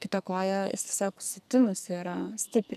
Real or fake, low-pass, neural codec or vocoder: fake; 14.4 kHz; codec, 44.1 kHz, 2.6 kbps, SNAC